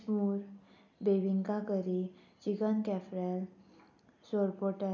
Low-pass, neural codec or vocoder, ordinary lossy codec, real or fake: 7.2 kHz; none; none; real